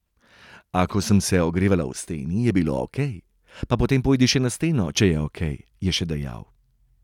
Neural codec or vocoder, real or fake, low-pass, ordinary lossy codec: none; real; 19.8 kHz; none